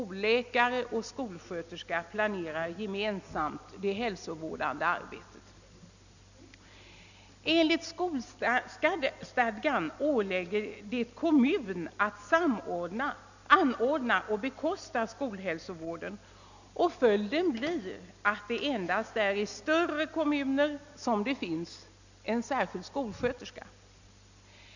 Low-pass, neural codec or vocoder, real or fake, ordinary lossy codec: 7.2 kHz; none; real; none